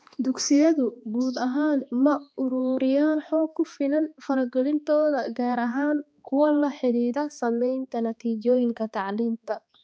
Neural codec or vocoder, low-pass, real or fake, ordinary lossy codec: codec, 16 kHz, 2 kbps, X-Codec, HuBERT features, trained on balanced general audio; none; fake; none